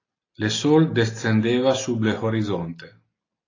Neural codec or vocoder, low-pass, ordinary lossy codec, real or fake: none; 7.2 kHz; AAC, 32 kbps; real